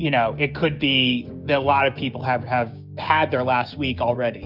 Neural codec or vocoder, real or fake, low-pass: codec, 16 kHz, 6 kbps, DAC; fake; 5.4 kHz